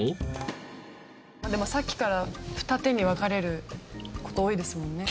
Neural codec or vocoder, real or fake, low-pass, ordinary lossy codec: none; real; none; none